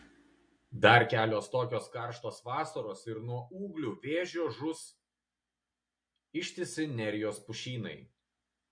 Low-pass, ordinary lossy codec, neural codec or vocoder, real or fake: 9.9 kHz; MP3, 48 kbps; none; real